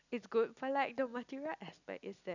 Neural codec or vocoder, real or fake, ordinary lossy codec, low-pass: none; real; none; 7.2 kHz